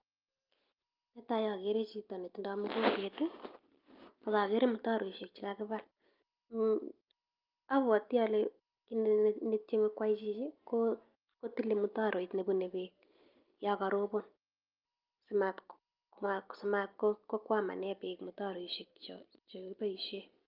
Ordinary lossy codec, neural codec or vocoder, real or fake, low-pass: Opus, 24 kbps; none; real; 5.4 kHz